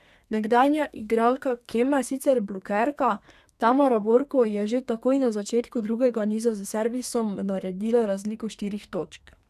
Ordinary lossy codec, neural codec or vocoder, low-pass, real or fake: none; codec, 32 kHz, 1.9 kbps, SNAC; 14.4 kHz; fake